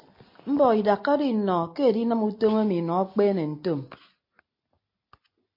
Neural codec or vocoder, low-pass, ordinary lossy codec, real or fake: none; 5.4 kHz; MP3, 32 kbps; real